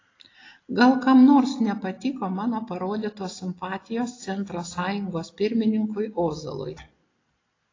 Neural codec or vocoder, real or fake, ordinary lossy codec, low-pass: none; real; AAC, 32 kbps; 7.2 kHz